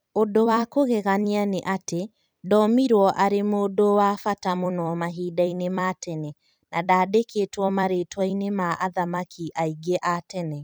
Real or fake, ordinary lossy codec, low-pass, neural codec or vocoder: fake; none; none; vocoder, 44.1 kHz, 128 mel bands every 256 samples, BigVGAN v2